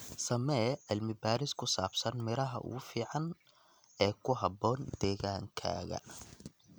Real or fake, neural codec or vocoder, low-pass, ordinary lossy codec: real; none; none; none